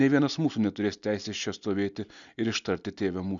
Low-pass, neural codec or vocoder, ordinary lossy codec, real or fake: 7.2 kHz; none; MP3, 96 kbps; real